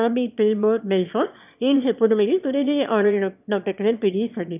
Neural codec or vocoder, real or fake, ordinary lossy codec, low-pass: autoencoder, 22.05 kHz, a latent of 192 numbers a frame, VITS, trained on one speaker; fake; none; 3.6 kHz